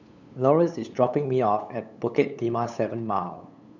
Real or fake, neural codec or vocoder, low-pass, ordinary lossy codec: fake; codec, 16 kHz, 8 kbps, FunCodec, trained on LibriTTS, 25 frames a second; 7.2 kHz; none